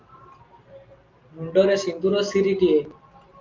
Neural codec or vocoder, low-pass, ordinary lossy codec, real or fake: none; 7.2 kHz; Opus, 24 kbps; real